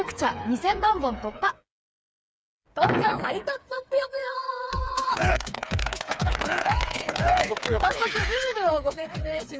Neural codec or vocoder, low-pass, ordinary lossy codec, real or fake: codec, 16 kHz, 4 kbps, FreqCodec, smaller model; none; none; fake